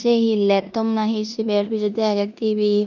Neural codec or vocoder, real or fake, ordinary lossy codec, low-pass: codec, 16 kHz in and 24 kHz out, 0.9 kbps, LongCat-Audio-Codec, four codebook decoder; fake; none; 7.2 kHz